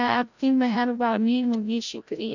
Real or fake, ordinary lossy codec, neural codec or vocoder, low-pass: fake; none; codec, 16 kHz, 0.5 kbps, FreqCodec, larger model; 7.2 kHz